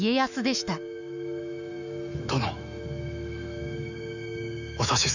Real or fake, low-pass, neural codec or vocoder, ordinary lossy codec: fake; 7.2 kHz; autoencoder, 48 kHz, 128 numbers a frame, DAC-VAE, trained on Japanese speech; none